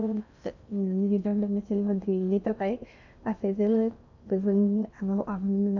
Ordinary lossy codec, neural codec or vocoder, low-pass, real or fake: none; codec, 16 kHz in and 24 kHz out, 0.6 kbps, FocalCodec, streaming, 2048 codes; 7.2 kHz; fake